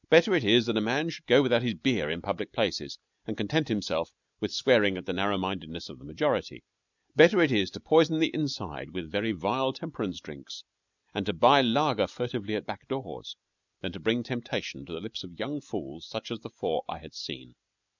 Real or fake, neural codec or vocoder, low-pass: real; none; 7.2 kHz